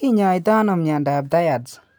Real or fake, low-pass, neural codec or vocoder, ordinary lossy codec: real; none; none; none